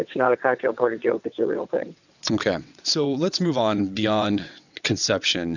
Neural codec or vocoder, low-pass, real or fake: vocoder, 22.05 kHz, 80 mel bands, WaveNeXt; 7.2 kHz; fake